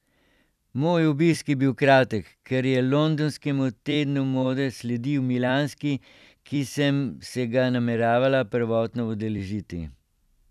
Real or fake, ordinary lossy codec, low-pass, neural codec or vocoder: fake; none; 14.4 kHz; vocoder, 44.1 kHz, 128 mel bands every 256 samples, BigVGAN v2